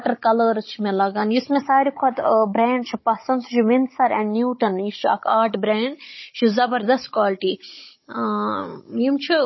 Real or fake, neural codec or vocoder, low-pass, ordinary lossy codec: fake; codec, 16 kHz, 16 kbps, FunCodec, trained on Chinese and English, 50 frames a second; 7.2 kHz; MP3, 24 kbps